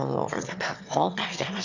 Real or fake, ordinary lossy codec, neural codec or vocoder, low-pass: fake; none; autoencoder, 22.05 kHz, a latent of 192 numbers a frame, VITS, trained on one speaker; 7.2 kHz